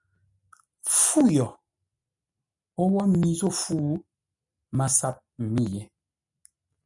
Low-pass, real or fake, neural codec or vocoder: 10.8 kHz; real; none